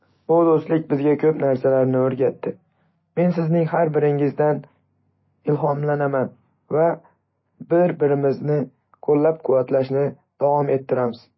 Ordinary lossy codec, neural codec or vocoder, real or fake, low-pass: MP3, 24 kbps; none; real; 7.2 kHz